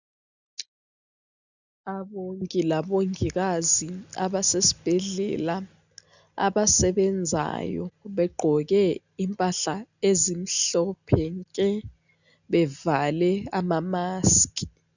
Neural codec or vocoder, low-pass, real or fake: none; 7.2 kHz; real